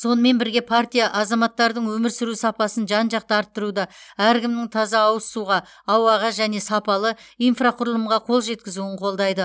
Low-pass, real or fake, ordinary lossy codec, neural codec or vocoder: none; real; none; none